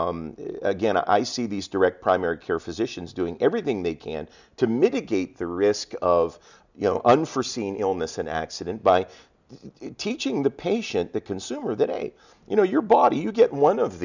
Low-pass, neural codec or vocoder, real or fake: 7.2 kHz; none; real